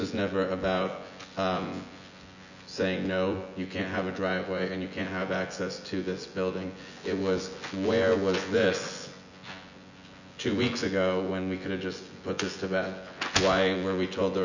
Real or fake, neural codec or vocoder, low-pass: fake; vocoder, 24 kHz, 100 mel bands, Vocos; 7.2 kHz